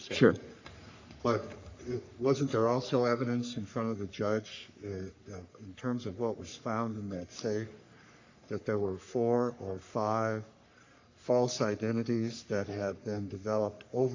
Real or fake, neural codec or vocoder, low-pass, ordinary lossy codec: fake; codec, 44.1 kHz, 3.4 kbps, Pupu-Codec; 7.2 kHz; AAC, 48 kbps